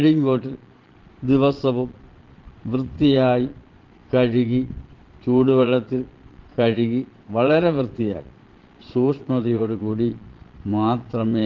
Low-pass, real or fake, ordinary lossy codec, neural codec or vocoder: 7.2 kHz; fake; Opus, 16 kbps; vocoder, 22.05 kHz, 80 mel bands, Vocos